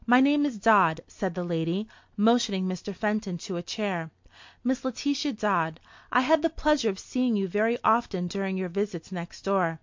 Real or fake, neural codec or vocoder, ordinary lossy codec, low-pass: real; none; MP3, 48 kbps; 7.2 kHz